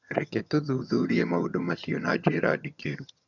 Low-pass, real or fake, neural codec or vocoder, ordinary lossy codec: 7.2 kHz; fake; vocoder, 22.05 kHz, 80 mel bands, HiFi-GAN; none